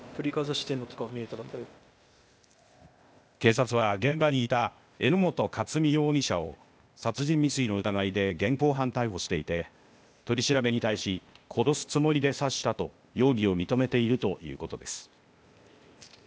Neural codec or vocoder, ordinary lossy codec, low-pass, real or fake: codec, 16 kHz, 0.8 kbps, ZipCodec; none; none; fake